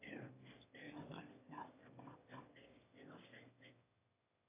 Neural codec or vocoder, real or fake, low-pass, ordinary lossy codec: autoencoder, 22.05 kHz, a latent of 192 numbers a frame, VITS, trained on one speaker; fake; 3.6 kHz; AAC, 24 kbps